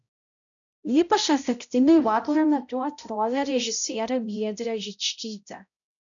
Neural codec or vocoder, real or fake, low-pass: codec, 16 kHz, 0.5 kbps, X-Codec, HuBERT features, trained on balanced general audio; fake; 7.2 kHz